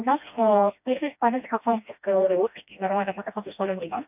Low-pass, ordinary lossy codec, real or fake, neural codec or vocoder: 3.6 kHz; none; fake; codec, 16 kHz, 1 kbps, FreqCodec, smaller model